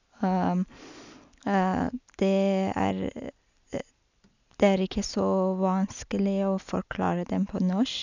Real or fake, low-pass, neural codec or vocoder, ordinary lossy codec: real; 7.2 kHz; none; none